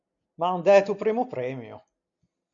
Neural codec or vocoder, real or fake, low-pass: none; real; 7.2 kHz